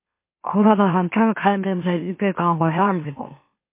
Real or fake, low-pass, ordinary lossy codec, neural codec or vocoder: fake; 3.6 kHz; MP3, 24 kbps; autoencoder, 44.1 kHz, a latent of 192 numbers a frame, MeloTTS